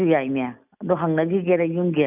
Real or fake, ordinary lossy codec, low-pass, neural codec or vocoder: real; none; 3.6 kHz; none